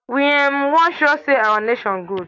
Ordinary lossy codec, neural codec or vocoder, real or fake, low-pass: AAC, 48 kbps; none; real; 7.2 kHz